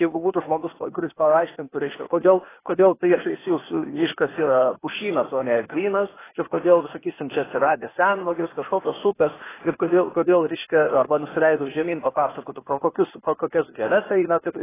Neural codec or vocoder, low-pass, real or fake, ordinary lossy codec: codec, 16 kHz, 0.8 kbps, ZipCodec; 3.6 kHz; fake; AAC, 16 kbps